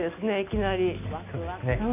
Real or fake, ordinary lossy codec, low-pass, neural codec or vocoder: real; none; 3.6 kHz; none